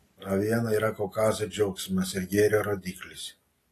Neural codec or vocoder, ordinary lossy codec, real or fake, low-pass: none; AAC, 48 kbps; real; 14.4 kHz